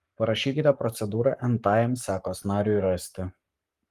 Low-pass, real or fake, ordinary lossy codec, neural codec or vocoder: 14.4 kHz; fake; Opus, 32 kbps; codec, 44.1 kHz, 7.8 kbps, Pupu-Codec